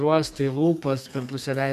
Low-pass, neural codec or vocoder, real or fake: 14.4 kHz; codec, 32 kHz, 1.9 kbps, SNAC; fake